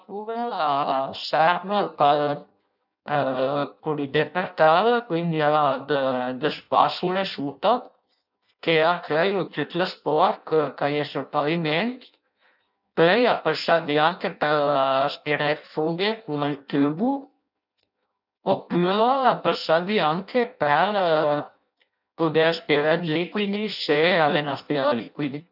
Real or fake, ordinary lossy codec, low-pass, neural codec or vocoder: fake; none; 5.4 kHz; codec, 16 kHz in and 24 kHz out, 0.6 kbps, FireRedTTS-2 codec